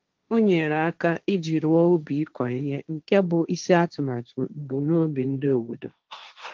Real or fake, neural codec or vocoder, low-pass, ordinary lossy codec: fake; codec, 16 kHz, 1.1 kbps, Voila-Tokenizer; 7.2 kHz; Opus, 32 kbps